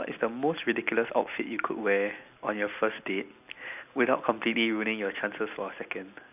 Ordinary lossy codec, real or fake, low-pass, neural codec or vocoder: none; real; 3.6 kHz; none